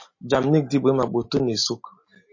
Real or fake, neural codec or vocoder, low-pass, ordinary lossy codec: real; none; 7.2 kHz; MP3, 32 kbps